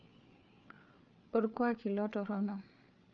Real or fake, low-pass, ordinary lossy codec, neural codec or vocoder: fake; 7.2 kHz; none; codec, 16 kHz, 8 kbps, FreqCodec, larger model